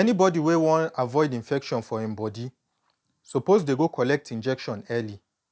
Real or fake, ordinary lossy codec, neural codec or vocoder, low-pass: real; none; none; none